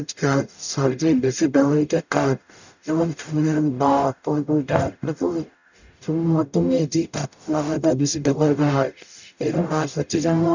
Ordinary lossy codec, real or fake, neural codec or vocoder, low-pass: none; fake; codec, 44.1 kHz, 0.9 kbps, DAC; 7.2 kHz